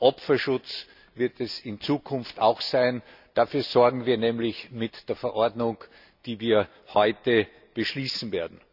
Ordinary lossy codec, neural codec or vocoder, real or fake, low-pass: none; none; real; 5.4 kHz